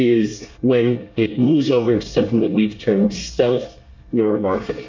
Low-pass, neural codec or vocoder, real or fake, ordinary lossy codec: 7.2 kHz; codec, 24 kHz, 1 kbps, SNAC; fake; MP3, 48 kbps